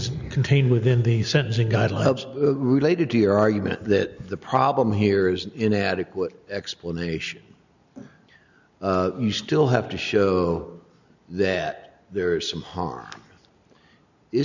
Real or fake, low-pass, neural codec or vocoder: real; 7.2 kHz; none